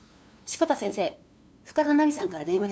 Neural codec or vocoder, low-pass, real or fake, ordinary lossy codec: codec, 16 kHz, 2 kbps, FunCodec, trained on LibriTTS, 25 frames a second; none; fake; none